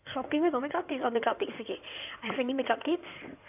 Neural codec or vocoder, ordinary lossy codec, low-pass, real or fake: codec, 16 kHz in and 24 kHz out, 2.2 kbps, FireRedTTS-2 codec; none; 3.6 kHz; fake